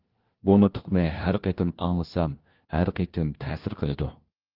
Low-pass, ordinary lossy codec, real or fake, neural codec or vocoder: 5.4 kHz; Opus, 16 kbps; fake; codec, 16 kHz, 1 kbps, FunCodec, trained on LibriTTS, 50 frames a second